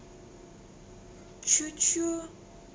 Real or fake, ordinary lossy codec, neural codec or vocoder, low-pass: real; none; none; none